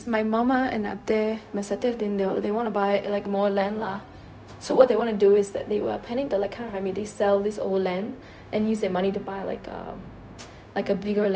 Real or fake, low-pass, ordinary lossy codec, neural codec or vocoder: fake; none; none; codec, 16 kHz, 0.4 kbps, LongCat-Audio-Codec